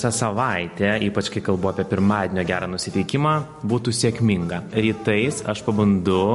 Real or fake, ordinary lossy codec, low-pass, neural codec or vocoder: real; MP3, 48 kbps; 14.4 kHz; none